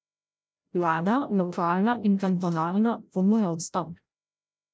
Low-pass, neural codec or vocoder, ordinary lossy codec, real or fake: none; codec, 16 kHz, 0.5 kbps, FreqCodec, larger model; none; fake